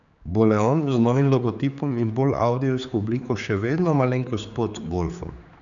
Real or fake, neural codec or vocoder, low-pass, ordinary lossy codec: fake; codec, 16 kHz, 4 kbps, X-Codec, HuBERT features, trained on general audio; 7.2 kHz; none